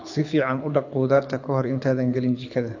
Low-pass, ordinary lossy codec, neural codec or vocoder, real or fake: 7.2 kHz; AAC, 48 kbps; codec, 24 kHz, 6 kbps, HILCodec; fake